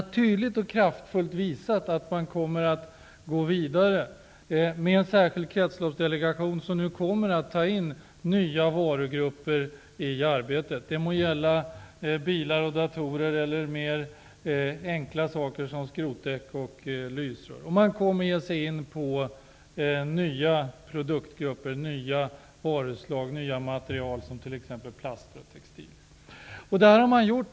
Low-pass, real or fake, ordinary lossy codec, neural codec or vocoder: none; real; none; none